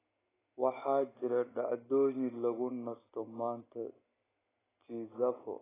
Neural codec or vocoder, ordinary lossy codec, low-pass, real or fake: none; AAC, 16 kbps; 3.6 kHz; real